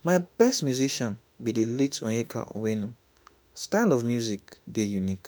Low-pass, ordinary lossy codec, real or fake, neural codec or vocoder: none; none; fake; autoencoder, 48 kHz, 32 numbers a frame, DAC-VAE, trained on Japanese speech